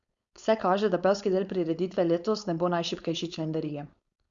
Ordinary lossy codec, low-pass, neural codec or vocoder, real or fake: Opus, 64 kbps; 7.2 kHz; codec, 16 kHz, 4.8 kbps, FACodec; fake